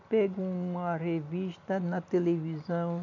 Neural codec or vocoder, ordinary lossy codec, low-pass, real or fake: none; none; 7.2 kHz; real